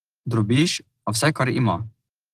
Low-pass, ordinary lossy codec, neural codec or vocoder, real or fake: 14.4 kHz; Opus, 16 kbps; none; real